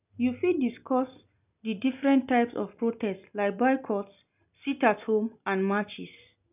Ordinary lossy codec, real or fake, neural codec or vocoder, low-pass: none; real; none; 3.6 kHz